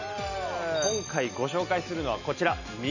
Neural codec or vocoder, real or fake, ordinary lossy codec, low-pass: none; real; none; 7.2 kHz